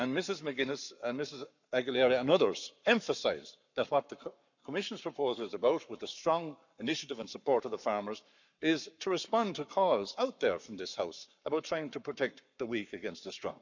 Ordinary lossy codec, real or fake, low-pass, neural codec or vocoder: none; fake; 7.2 kHz; codec, 44.1 kHz, 7.8 kbps, Pupu-Codec